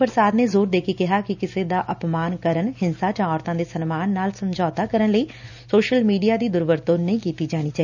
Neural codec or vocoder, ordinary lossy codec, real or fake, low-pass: none; none; real; 7.2 kHz